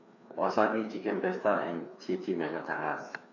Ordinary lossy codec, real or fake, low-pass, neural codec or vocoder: none; fake; 7.2 kHz; codec, 16 kHz, 2 kbps, FreqCodec, larger model